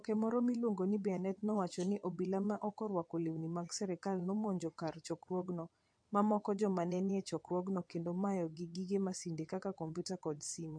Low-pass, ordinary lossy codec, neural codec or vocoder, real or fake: 9.9 kHz; MP3, 48 kbps; vocoder, 22.05 kHz, 80 mel bands, WaveNeXt; fake